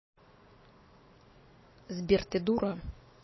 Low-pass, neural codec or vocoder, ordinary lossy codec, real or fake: 7.2 kHz; none; MP3, 24 kbps; real